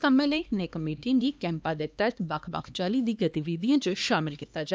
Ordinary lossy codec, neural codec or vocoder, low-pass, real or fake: none; codec, 16 kHz, 2 kbps, X-Codec, HuBERT features, trained on LibriSpeech; none; fake